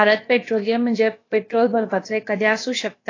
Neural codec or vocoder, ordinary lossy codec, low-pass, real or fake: codec, 16 kHz, about 1 kbps, DyCAST, with the encoder's durations; AAC, 32 kbps; 7.2 kHz; fake